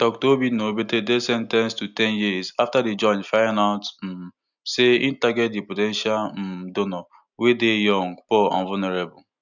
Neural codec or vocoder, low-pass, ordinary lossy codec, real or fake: none; 7.2 kHz; none; real